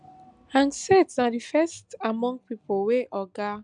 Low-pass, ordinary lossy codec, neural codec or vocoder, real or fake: 9.9 kHz; none; none; real